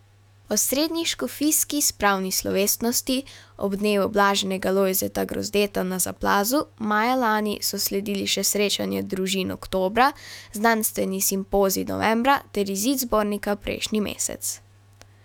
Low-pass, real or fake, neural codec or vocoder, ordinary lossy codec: 19.8 kHz; fake; autoencoder, 48 kHz, 128 numbers a frame, DAC-VAE, trained on Japanese speech; none